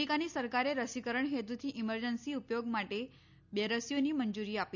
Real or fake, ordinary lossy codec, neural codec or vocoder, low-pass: real; none; none; 7.2 kHz